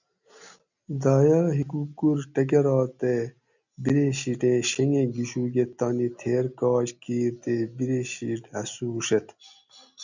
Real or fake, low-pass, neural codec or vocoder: real; 7.2 kHz; none